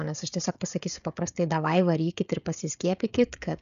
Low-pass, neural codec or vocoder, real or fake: 7.2 kHz; codec, 16 kHz, 16 kbps, FreqCodec, smaller model; fake